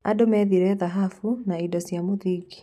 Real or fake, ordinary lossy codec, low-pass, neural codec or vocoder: real; none; 14.4 kHz; none